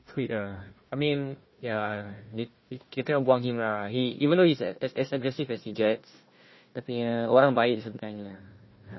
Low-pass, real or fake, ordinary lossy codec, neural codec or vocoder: 7.2 kHz; fake; MP3, 24 kbps; codec, 16 kHz, 1 kbps, FunCodec, trained on Chinese and English, 50 frames a second